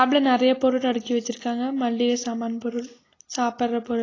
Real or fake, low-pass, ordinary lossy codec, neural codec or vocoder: real; 7.2 kHz; AAC, 32 kbps; none